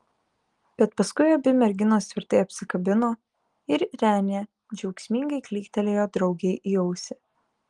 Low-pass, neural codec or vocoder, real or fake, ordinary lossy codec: 10.8 kHz; none; real; Opus, 24 kbps